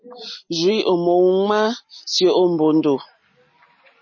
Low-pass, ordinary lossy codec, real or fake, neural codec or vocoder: 7.2 kHz; MP3, 32 kbps; real; none